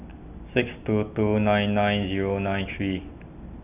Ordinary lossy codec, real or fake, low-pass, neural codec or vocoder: none; real; 3.6 kHz; none